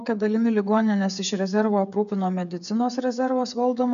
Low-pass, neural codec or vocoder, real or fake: 7.2 kHz; codec, 16 kHz, 8 kbps, FreqCodec, smaller model; fake